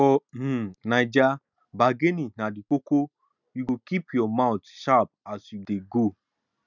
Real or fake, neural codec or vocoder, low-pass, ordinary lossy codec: real; none; 7.2 kHz; none